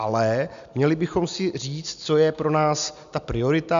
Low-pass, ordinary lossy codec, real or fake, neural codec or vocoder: 7.2 kHz; MP3, 64 kbps; real; none